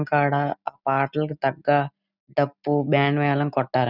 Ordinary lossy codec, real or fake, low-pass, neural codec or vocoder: none; real; 5.4 kHz; none